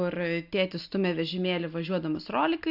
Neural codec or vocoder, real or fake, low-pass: none; real; 5.4 kHz